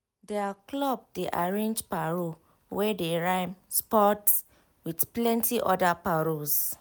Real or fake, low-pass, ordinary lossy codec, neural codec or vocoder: real; none; none; none